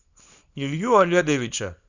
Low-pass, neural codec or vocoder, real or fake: 7.2 kHz; codec, 24 kHz, 0.9 kbps, WavTokenizer, small release; fake